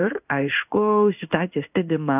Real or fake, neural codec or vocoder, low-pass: fake; codec, 16 kHz in and 24 kHz out, 1 kbps, XY-Tokenizer; 3.6 kHz